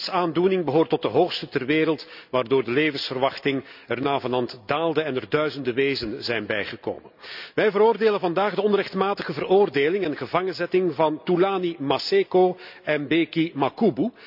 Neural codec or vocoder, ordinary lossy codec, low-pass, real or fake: none; none; 5.4 kHz; real